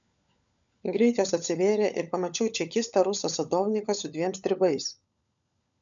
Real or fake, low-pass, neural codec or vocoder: fake; 7.2 kHz; codec, 16 kHz, 16 kbps, FunCodec, trained on LibriTTS, 50 frames a second